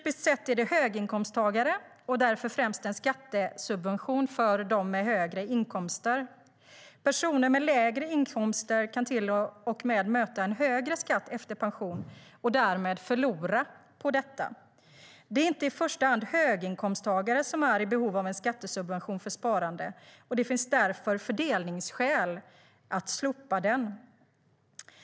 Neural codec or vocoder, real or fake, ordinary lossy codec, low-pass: none; real; none; none